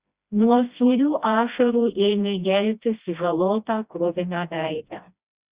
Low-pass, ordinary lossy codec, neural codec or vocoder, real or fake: 3.6 kHz; Opus, 64 kbps; codec, 16 kHz, 1 kbps, FreqCodec, smaller model; fake